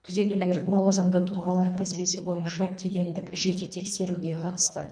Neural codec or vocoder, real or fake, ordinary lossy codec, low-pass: codec, 24 kHz, 1.5 kbps, HILCodec; fake; none; 9.9 kHz